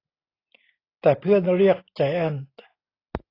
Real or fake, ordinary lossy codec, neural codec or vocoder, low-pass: real; AAC, 24 kbps; none; 5.4 kHz